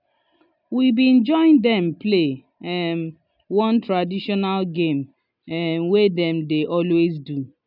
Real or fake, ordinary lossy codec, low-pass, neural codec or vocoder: real; none; 5.4 kHz; none